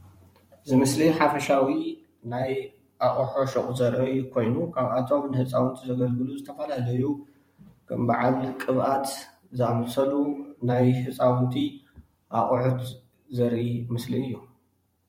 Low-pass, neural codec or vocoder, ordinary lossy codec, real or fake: 19.8 kHz; vocoder, 44.1 kHz, 128 mel bands every 512 samples, BigVGAN v2; MP3, 64 kbps; fake